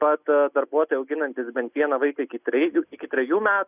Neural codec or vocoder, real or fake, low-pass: none; real; 3.6 kHz